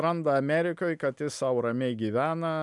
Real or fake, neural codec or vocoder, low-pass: real; none; 10.8 kHz